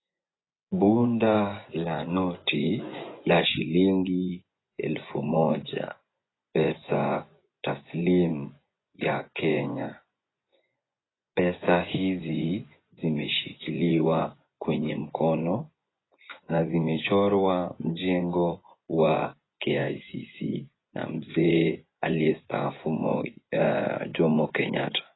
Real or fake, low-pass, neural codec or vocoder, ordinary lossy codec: fake; 7.2 kHz; vocoder, 24 kHz, 100 mel bands, Vocos; AAC, 16 kbps